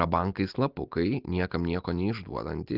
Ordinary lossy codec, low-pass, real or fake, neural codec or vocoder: Opus, 24 kbps; 5.4 kHz; real; none